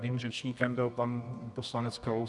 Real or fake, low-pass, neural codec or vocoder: fake; 10.8 kHz; codec, 24 kHz, 0.9 kbps, WavTokenizer, medium music audio release